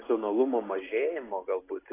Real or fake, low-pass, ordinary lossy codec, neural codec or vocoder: real; 3.6 kHz; AAC, 16 kbps; none